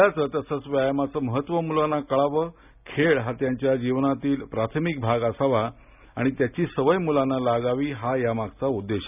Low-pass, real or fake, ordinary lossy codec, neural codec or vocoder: 3.6 kHz; real; none; none